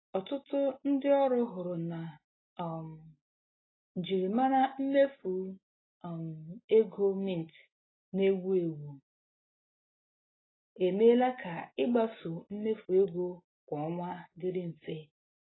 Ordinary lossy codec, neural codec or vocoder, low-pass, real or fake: AAC, 16 kbps; none; 7.2 kHz; real